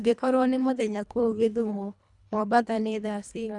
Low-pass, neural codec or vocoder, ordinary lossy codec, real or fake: 10.8 kHz; codec, 24 kHz, 1.5 kbps, HILCodec; none; fake